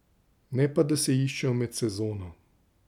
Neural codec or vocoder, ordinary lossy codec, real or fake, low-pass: none; none; real; 19.8 kHz